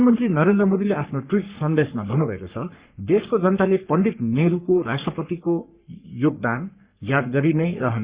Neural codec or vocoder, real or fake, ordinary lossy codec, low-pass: codec, 44.1 kHz, 3.4 kbps, Pupu-Codec; fake; Opus, 64 kbps; 3.6 kHz